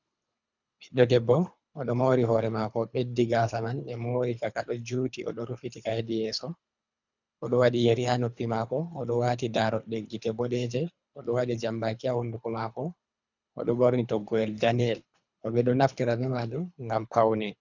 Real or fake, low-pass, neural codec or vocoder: fake; 7.2 kHz; codec, 24 kHz, 3 kbps, HILCodec